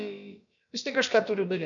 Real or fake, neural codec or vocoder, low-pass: fake; codec, 16 kHz, about 1 kbps, DyCAST, with the encoder's durations; 7.2 kHz